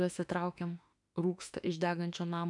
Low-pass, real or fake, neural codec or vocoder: 10.8 kHz; fake; autoencoder, 48 kHz, 32 numbers a frame, DAC-VAE, trained on Japanese speech